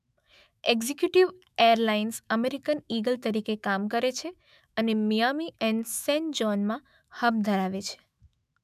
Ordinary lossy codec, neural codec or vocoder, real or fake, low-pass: none; autoencoder, 48 kHz, 128 numbers a frame, DAC-VAE, trained on Japanese speech; fake; 14.4 kHz